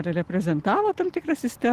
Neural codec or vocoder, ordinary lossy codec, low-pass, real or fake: none; Opus, 16 kbps; 14.4 kHz; real